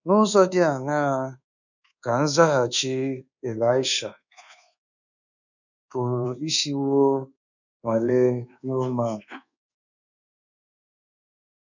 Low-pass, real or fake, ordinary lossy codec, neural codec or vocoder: 7.2 kHz; fake; none; codec, 24 kHz, 1.2 kbps, DualCodec